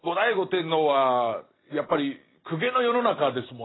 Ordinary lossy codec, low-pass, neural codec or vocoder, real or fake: AAC, 16 kbps; 7.2 kHz; none; real